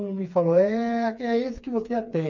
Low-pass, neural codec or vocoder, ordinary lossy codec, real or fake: 7.2 kHz; codec, 16 kHz, 4 kbps, FreqCodec, smaller model; none; fake